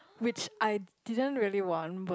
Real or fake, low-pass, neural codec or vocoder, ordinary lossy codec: real; none; none; none